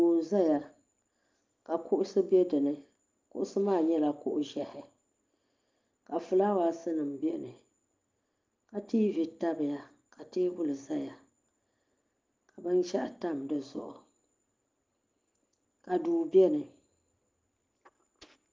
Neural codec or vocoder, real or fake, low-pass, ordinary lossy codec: none; real; 7.2 kHz; Opus, 32 kbps